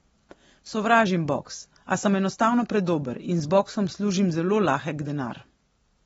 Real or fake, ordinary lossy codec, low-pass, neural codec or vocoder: real; AAC, 24 kbps; 19.8 kHz; none